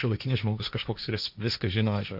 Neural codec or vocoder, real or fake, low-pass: codec, 16 kHz, 1.1 kbps, Voila-Tokenizer; fake; 5.4 kHz